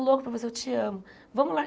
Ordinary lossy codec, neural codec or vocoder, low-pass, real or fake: none; none; none; real